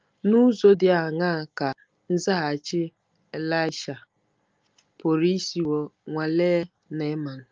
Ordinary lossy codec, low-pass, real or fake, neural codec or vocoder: Opus, 24 kbps; 7.2 kHz; real; none